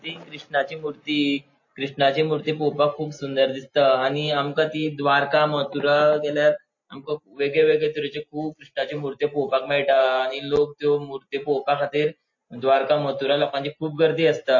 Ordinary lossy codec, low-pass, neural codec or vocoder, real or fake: MP3, 32 kbps; 7.2 kHz; none; real